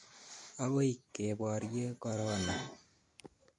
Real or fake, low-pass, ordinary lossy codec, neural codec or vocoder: real; 10.8 kHz; MP3, 48 kbps; none